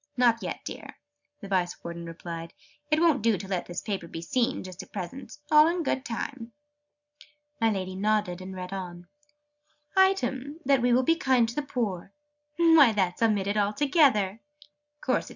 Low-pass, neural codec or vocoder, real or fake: 7.2 kHz; none; real